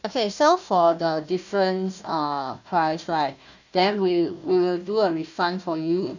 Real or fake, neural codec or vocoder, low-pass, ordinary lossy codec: fake; codec, 16 kHz, 1 kbps, FunCodec, trained on Chinese and English, 50 frames a second; 7.2 kHz; none